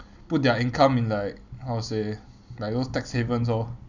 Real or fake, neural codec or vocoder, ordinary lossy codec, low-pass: real; none; none; 7.2 kHz